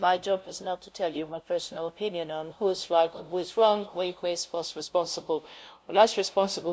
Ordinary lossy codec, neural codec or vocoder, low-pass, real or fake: none; codec, 16 kHz, 0.5 kbps, FunCodec, trained on LibriTTS, 25 frames a second; none; fake